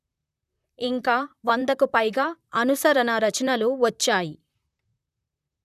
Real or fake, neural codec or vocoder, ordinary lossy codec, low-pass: fake; vocoder, 44.1 kHz, 128 mel bands, Pupu-Vocoder; none; 14.4 kHz